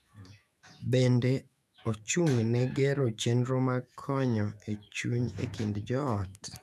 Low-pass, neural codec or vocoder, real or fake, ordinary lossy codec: 14.4 kHz; autoencoder, 48 kHz, 128 numbers a frame, DAC-VAE, trained on Japanese speech; fake; none